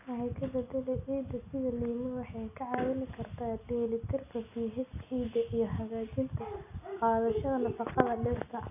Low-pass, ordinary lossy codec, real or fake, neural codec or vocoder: 3.6 kHz; none; real; none